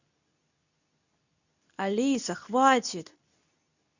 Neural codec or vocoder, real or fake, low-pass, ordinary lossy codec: codec, 24 kHz, 0.9 kbps, WavTokenizer, medium speech release version 2; fake; 7.2 kHz; none